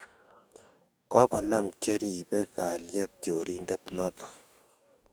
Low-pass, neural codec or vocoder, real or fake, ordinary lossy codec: none; codec, 44.1 kHz, 2.6 kbps, DAC; fake; none